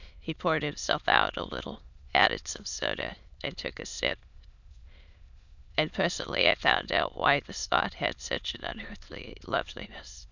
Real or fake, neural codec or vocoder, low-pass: fake; autoencoder, 22.05 kHz, a latent of 192 numbers a frame, VITS, trained on many speakers; 7.2 kHz